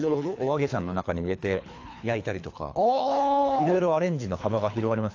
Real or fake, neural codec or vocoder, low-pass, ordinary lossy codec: fake; codec, 24 kHz, 3 kbps, HILCodec; 7.2 kHz; AAC, 48 kbps